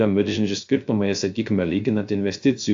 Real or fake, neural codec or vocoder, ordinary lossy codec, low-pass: fake; codec, 16 kHz, 0.3 kbps, FocalCodec; AAC, 64 kbps; 7.2 kHz